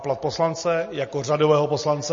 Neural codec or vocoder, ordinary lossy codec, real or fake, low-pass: none; MP3, 32 kbps; real; 7.2 kHz